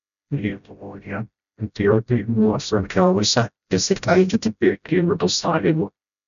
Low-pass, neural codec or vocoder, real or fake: 7.2 kHz; codec, 16 kHz, 0.5 kbps, FreqCodec, smaller model; fake